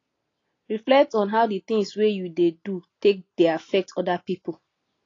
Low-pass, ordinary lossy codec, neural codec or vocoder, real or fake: 7.2 kHz; AAC, 32 kbps; none; real